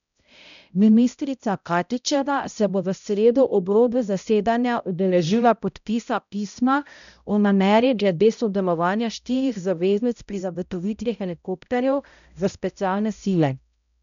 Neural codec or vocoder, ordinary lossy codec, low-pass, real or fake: codec, 16 kHz, 0.5 kbps, X-Codec, HuBERT features, trained on balanced general audio; none; 7.2 kHz; fake